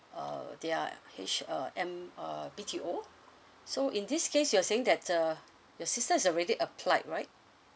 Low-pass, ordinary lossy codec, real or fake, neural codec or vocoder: none; none; real; none